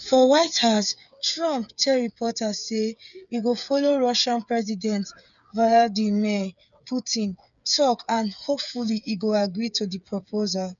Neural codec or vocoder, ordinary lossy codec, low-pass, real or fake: codec, 16 kHz, 8 kbps, FreqCodec, smaller model; none; 7.2 kHz; fake